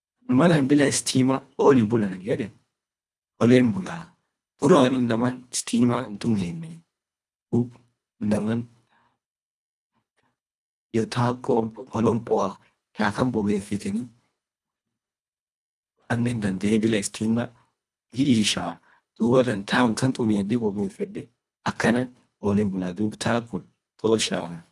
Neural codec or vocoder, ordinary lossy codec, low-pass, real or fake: codec, 24 kHz, 1.5 kbps, HILCodec; none; none; fake